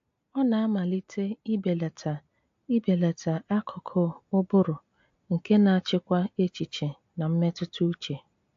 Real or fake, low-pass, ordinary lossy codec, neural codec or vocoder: real; 7.2 kHz; AAC, 48 kbps; none